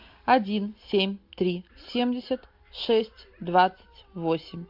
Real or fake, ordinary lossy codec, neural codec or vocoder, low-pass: real; MP3, 48 kbps; none; 5.4 kHz